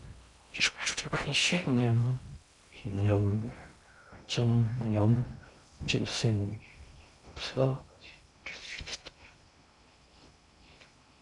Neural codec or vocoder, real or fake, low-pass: codec, 16 kHz in and 24 kHz out, 0.6 kbps, FocalCodec, streaming, 2048 codes; fake; 10.8 kHz